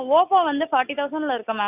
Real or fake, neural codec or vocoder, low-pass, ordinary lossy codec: real; none; 3.6 kHz; none